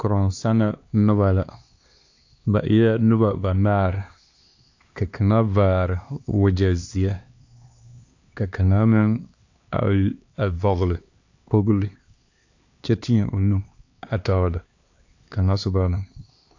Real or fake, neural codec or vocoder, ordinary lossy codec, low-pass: fake; codec, 16 kHz, 2 kbps, X-Codec, HuBERT features, trained on LibriSpeech; AAC, 48 kbps; 7.2 kHz